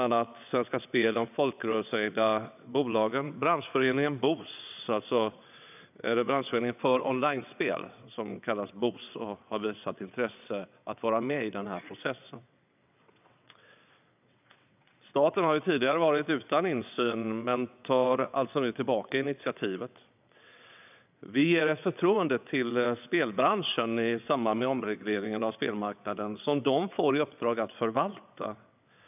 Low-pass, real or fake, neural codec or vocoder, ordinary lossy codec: 3.6 kHz; fake; vocoder, 22.05 kHz, 80 mel bands, WaveNeXt; none